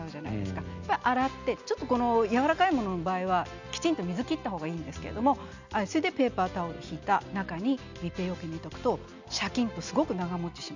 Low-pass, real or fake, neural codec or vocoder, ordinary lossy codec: 7.2 kHz; real; none; none